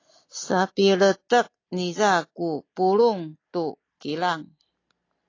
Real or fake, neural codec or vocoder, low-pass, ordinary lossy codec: real; none; 7.2 kHz; AAC, 32 kbps